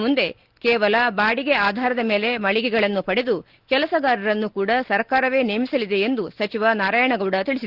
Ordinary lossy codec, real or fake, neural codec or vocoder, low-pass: Opus, 16 kbps; real; none; 5.4 kHz